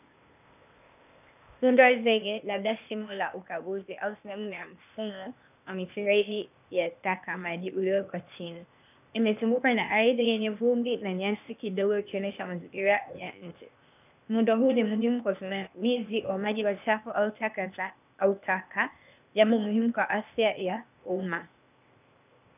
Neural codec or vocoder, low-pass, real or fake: codec, 16 kHz, 0.8 kbps, ZipCodec; 3.6 kHz; fake